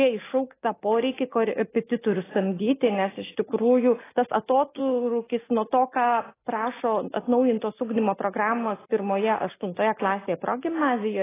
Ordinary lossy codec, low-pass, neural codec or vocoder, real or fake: AAC, 16 kbps; 3.6 kHz; none; real